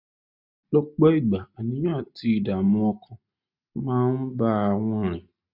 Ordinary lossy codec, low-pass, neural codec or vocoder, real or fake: none; 5.4 kHz; none; real